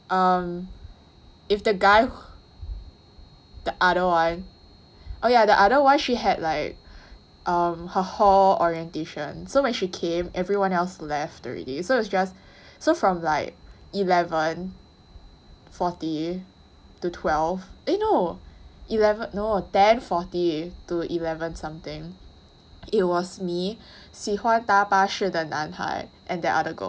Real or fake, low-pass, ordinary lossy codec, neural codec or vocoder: real; none; none; none